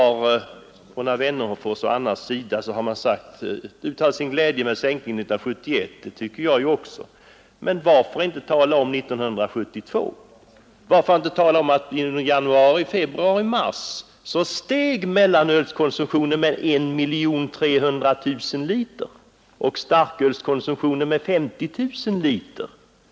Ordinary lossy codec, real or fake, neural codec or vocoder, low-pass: none; real; none; none